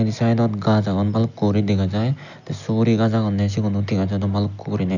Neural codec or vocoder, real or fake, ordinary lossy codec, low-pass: none; real; none; 7.2 kHz